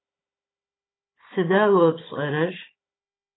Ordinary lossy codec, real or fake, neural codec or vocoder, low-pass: AAC, 16 kbps; fake; codec, 16 kHz, 16 kbps, FunCodec, trained on Chinese and English, 50 frames a second; 7.2 kHz